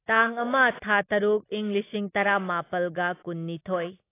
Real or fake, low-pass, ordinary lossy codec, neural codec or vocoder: real; 3.6 kHz; AAC, 24 kbps; none